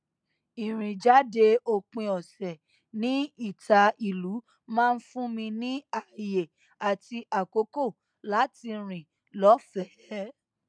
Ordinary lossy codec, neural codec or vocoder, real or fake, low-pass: MP3, 96 kbps; none; real; 9.9 kHz